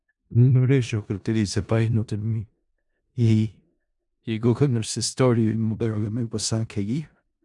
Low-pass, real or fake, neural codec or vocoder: 10.8 kHz; fake; codec, 16 kHz in and 24 kHz out, 0.4 kbps, LongCat-Audio-Codec, four codebook decoder